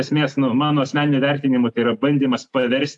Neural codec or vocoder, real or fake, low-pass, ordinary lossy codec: none; real; 10.8 kHz; AAC, 64 kbps